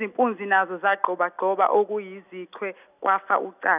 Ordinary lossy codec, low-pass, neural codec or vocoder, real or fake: none; 3.6 kHz; none; real